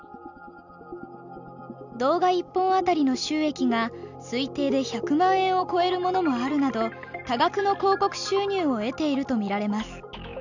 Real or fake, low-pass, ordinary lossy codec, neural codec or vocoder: real; 7.2 kHz; none; none